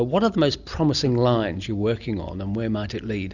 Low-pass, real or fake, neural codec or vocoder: 7.2 kHz; real; none